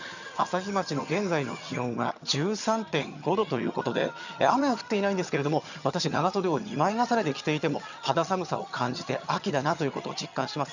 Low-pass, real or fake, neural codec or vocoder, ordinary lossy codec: 7.2 kHz; fake; vocoder, 22.05 kHz, 80 mel bands, HiFi-GAN; none